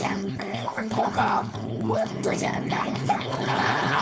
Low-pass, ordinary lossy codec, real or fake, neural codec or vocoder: none; none; fake; codec, 16 kHz, 4.8 kbps, FACodec